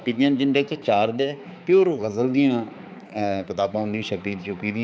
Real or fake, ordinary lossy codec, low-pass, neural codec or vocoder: fake; none; none; codec, 16 kHz, 4 kbps, X-Codec, HuBERT features, trained on balanced general audio